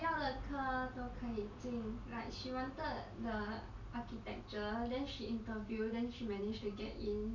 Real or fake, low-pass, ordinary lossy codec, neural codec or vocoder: real; 7.2 kHz; none; none